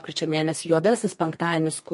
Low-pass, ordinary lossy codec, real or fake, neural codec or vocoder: 14.4 kHz; MP3, 48 kbps; fake; codec, 32 kHz, 1.9 kbps, SNAC